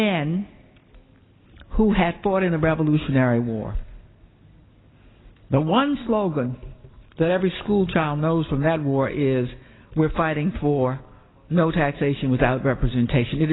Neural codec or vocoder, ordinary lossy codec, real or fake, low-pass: none; AAC, 16 kbps; real; 7.2 kHz